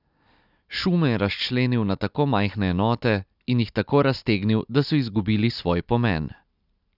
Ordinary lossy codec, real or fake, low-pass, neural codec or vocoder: none; real; 5.4 kHz; none